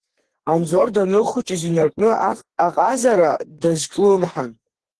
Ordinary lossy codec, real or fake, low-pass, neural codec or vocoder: Opus, 16 kbps; fake; 10.8 kHz; codec, 32 kHz, 1.9 kbps, SNAC